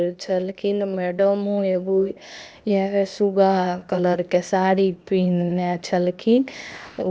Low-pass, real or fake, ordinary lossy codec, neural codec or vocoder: none; fake; none; codec, 16 kHz, 0.8 kbps, ZipCodec